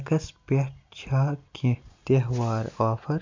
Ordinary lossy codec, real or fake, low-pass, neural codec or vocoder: MP3, 64 kbps; real; 7.2 kHz; none